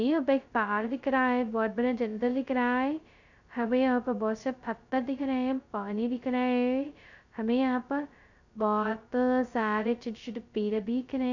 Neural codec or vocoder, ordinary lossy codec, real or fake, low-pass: codec, 16 kHz, 0.2 kbps, FocalCodec; none; fake; 7.2 kHz